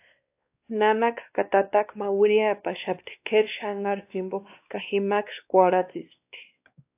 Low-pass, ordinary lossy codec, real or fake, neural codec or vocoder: 3.6 kHz; AAC, 32 kbps; fake; codec, 16 kHz, 2 kbps, X-Codec, WavLM features, trained on Multilingual LibriSpeech